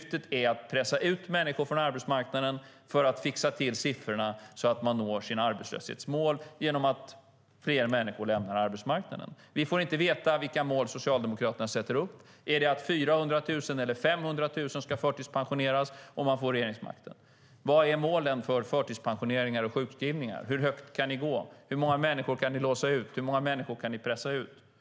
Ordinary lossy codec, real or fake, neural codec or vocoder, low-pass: none; real; none; none